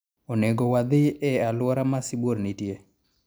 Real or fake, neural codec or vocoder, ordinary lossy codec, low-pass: real; none; none; none